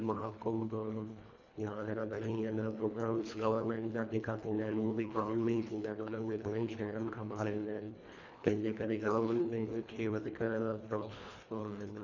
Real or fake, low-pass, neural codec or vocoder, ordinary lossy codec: fake; 7.2 kHz; codec, 24 kHz, 1.5 kbps, HILCodec; none